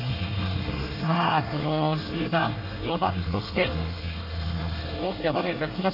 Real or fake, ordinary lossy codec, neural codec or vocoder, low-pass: fake; none; codec, 24 kHz, 1 kbps, SNAC; 5.4 kHz